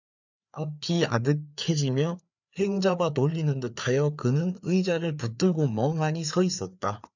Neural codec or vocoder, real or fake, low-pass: codec, 16 kHz, 4 kbps, FreqCodec, larger model; fake; 7.2 kHz